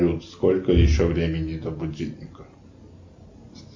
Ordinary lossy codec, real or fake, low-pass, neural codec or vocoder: MP3, 48 kbps; real; 7.2 kHz; none